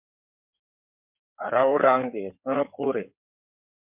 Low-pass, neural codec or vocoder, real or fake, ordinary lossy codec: 3.6 kHz; codec, 16 kHz in and 24 kHz out, 2.2 kbps, FireRedTTS-2 codec; fake; MP3, 32 kbps